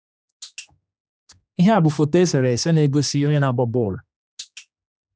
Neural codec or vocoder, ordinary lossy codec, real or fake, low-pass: codec, 16 kHz, 2 kbps, X-Codec, HuBERT features, trained on general audio; none; fake; none